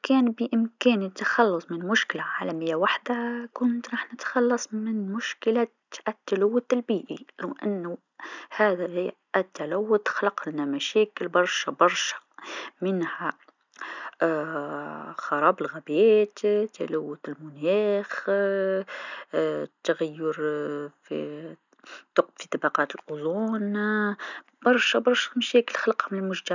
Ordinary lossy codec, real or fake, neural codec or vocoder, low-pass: none; real; none; 7.2 kHz